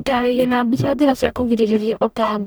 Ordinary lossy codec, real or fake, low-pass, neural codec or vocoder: none; fake; none; codec, 44.1 kHz, 0.9 kbps, DAC